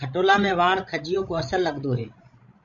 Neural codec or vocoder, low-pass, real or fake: codec, 16 kHz, 16 kbps, FreqCodec, larger model; 7.2 kHz; fake